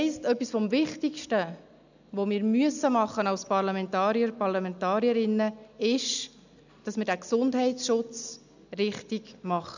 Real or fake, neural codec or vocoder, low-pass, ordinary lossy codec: real; none; 7.2 kHz; none